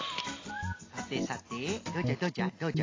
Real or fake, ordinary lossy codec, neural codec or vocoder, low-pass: real; AAC, 32 kbps; none; 7.2 kHz